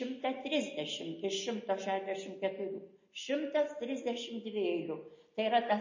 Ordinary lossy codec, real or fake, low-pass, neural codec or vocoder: MP3, 32 kbps; real; 7.2 kHz; none